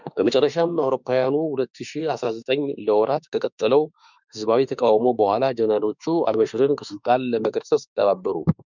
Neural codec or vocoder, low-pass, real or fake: autoencoder, 48 kHz, 32 numbers a frame, DAC-VAE, trained on Japanese speech; 7.2 kHz; fake